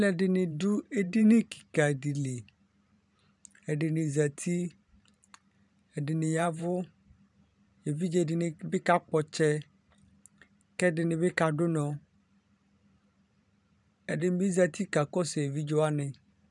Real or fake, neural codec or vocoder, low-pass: fake; vocoder, 44.1 kHz, 128 mel bands every 512 samples, BigVGAN v2; 10.8 kHz